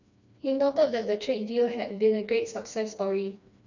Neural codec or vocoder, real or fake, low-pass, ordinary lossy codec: codec, 16 kHz, 2 kbps, FreqCodec, smaller model; fake; 7.2 kHz; none